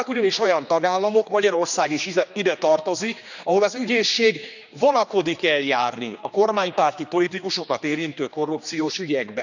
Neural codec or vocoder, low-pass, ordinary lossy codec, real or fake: codec, 16 kHz, 2 kbps, X-Codec, HuBERT features, trained on general audio; 7.2 kHz; none; fake